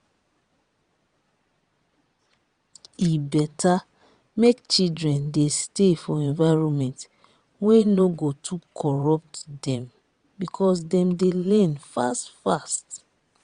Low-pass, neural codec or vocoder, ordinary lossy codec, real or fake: 9.9 kHz; vocoder, 22.05 kHz, 80 mel bands, Vocos; Opus, 64 kbps; fake